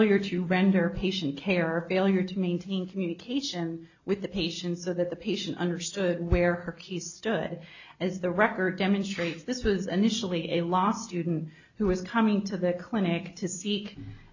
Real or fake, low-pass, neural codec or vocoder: real; 7.2 kHz; none